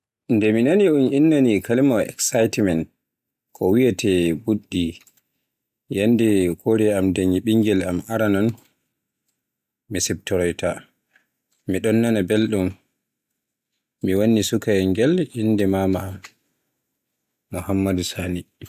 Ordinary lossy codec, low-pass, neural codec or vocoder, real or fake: AAC, 96 kbps; 14.4 kHz; none; real